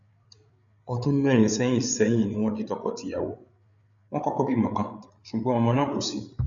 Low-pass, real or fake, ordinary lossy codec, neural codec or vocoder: 7.2 kHz; fake; none; codec, 16 kHz, 8 kbps, FreqCodec, larger model